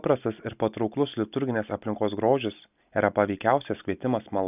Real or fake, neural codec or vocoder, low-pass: real; none; 3.6 kHz